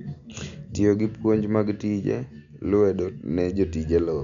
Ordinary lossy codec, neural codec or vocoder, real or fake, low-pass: none; none; real; 7.2 kHz